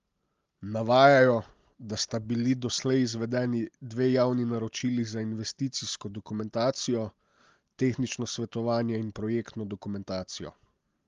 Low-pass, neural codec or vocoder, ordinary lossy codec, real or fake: 7.2 kHz; none; Opus, 32 kbps; real